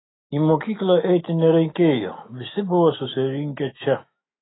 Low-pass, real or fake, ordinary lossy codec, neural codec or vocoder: 7.2 kHz; fake; AAC, 16 kbps; autoencoder, 48 kHz, 128 numbers a frame, DAC-VAE, trained on Japanese speech